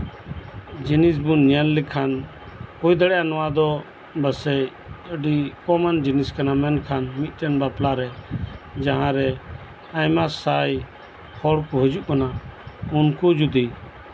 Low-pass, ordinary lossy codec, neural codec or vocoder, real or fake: none; none; none; real